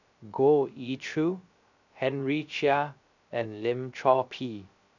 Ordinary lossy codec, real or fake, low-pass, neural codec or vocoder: none; fake; 7.2 kHz; codec, 16 kHz, 0.3 kbps, FocalCodec